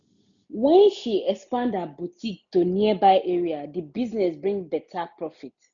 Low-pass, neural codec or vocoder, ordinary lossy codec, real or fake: 7.2 kHz; none; none; real